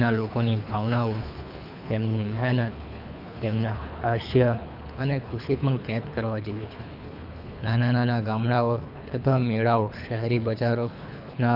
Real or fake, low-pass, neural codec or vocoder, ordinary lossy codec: fake; 5.4 kHz; codec, 24 kHz, 3 kbps, HILCodec; none